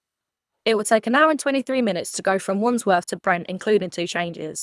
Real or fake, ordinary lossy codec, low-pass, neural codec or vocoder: fake; none; none; codec, 24 kHz, 3 kbps, HILCodec